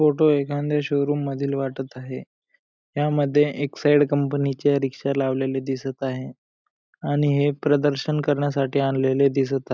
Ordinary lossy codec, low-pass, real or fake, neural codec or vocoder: none; none; real; none